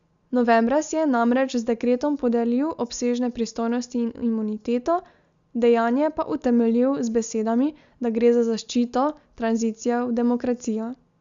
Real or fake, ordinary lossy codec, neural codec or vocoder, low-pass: real; Opus, 64 kbps; none; 7.2 kHz